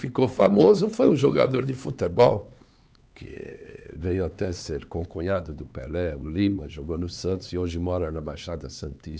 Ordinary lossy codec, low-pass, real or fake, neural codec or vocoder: none; none; fake; codec, 16 kHz, 4 kbps, X-Codec, HuBERT features, trained on LibriSpeech